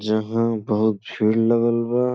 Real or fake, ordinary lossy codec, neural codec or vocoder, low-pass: real; none; none; none